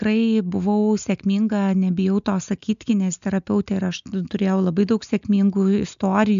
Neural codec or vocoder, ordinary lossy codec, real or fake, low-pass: none; AAC, 96 kbps; real; 7.2 kHz